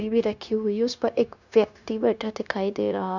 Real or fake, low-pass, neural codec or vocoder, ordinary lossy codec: fake; 7.2 kHz; codec, 16 kHz, 0.9 kbps, LongCat-Audio-Codec; none